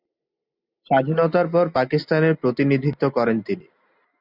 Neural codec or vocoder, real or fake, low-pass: none; real; 5.4 kHz